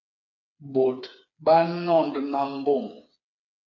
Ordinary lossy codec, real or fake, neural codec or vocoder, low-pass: MP3, 64 kbps; fake; codec, 16 kHz, 4 kbps, FreqCodec, smaller model; 7.2 kHz